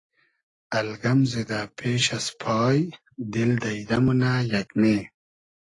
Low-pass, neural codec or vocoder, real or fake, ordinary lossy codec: 10.8 kHz; none; real; AAC, 32 kbps